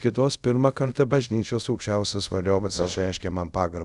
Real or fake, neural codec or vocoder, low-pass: fake; codec, 24 kHz, 0.5 kbps, DualCodec; 10.8 kHz